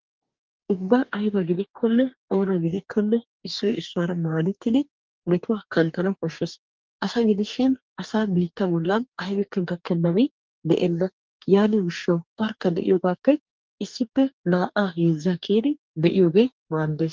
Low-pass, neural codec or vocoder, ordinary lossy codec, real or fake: 7.2 kHz; codec, 44.1 kHz, 2.6 kbps, DAC; Opus, 32 kbps; fake